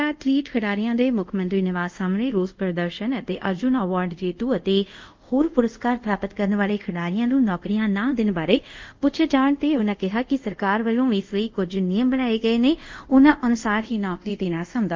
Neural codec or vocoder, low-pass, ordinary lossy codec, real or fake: codec, 24 kHz, 0.5 kbps, DualCodec; 7.2 kHz; Opus, 24 kbps; fake